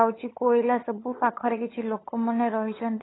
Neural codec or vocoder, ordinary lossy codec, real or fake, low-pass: codec, 16 kHz, 8 kbps, FunCodec, trained on LibriTTS, 25 frames a second; AAC, 16 kbps; fake; 7.2 kHz